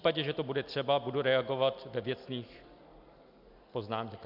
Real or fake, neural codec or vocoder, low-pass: real; none; 5.4 kHz